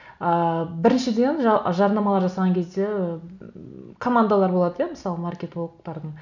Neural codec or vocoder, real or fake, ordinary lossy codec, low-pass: none; real; none; 7.2 kHz